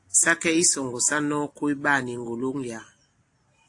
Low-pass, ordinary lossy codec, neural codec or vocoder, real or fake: 10.8 kHz; AAC, 48 kbps; none; real